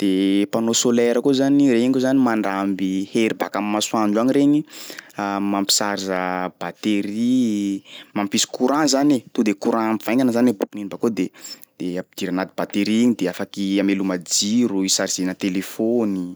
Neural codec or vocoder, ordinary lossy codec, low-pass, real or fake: none; none; none; real